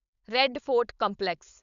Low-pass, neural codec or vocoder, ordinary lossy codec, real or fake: 7.2 kHz; codec, 16 kHz, 4.8 kbps, FACodec; none; fake